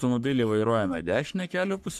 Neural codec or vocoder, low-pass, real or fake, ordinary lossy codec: codec, 44.1 kHz, 3.4 kbps, Pupu-Codec; 14.4 kHz; fake; MP3, 96 kbps